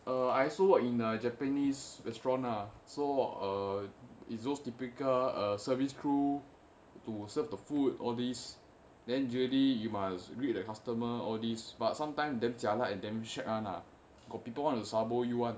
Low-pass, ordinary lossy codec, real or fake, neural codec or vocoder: none; none; real; none